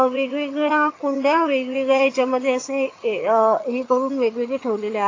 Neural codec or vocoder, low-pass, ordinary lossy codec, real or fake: vocoder, 22.05 kHz, 80 mel bands, HiFi-GAN; 7.2 kHz; AAC, 32 kbps; fake